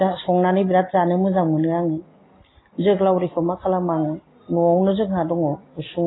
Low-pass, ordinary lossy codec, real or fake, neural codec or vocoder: 7.2 kHz; AAC, 16 kbps; real; none